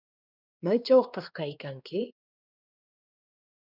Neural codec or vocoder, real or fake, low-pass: codec, 16 kHz, 2 kbps, X-Codec, WavLM features, trained on Multilingual LibriSpeech; fake; 5.4 kHz